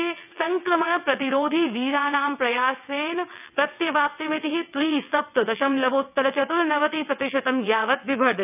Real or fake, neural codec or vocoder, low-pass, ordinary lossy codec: fake; vocoder, 22.05 kHz, 80 mel bands, WaveNeXt; 3.6 kHz; none